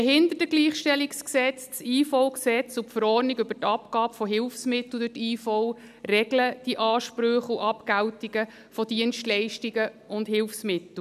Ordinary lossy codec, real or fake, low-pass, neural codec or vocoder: none; real; 14.4 kHz; none